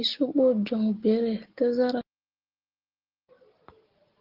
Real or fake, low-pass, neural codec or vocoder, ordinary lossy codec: real; 5.4 kHz; none; Opus, 16 kbps